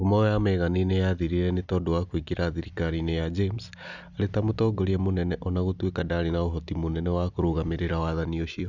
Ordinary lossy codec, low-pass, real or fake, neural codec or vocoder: none; 7.2 kHz; real; none